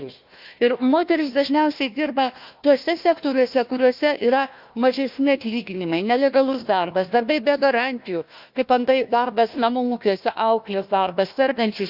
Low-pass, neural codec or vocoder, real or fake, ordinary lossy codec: 5.4 kHz; codec, 16 kHz, 1 kbps, FunCodec, trained on Chinese and English, 50 frames a second; fake; Opus, 64 kbps